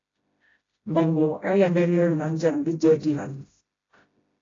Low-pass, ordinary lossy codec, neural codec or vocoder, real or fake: 7.2 kHz; AAC, 32 kbps; codec, 16 kHz, 0.5 kbps, FreqCodec, smaller model; fake